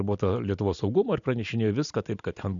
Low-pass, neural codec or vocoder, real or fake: 7.2 kHz; none; real